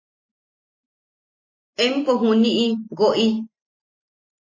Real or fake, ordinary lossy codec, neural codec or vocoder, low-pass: real; MP3, 32 kbps; none; 7.2 kHz